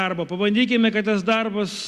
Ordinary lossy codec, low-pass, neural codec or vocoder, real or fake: Opus, 64 kbps; 14.4 kHz; none; real